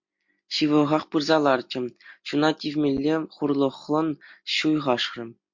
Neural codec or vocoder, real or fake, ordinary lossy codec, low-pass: none; real; MP3, 48 kbps; 7.2 kHz